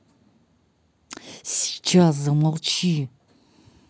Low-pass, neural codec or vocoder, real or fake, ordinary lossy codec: none; none; real; none